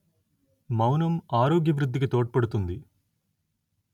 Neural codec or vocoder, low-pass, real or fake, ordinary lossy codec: none; 19.8 kHz; real; none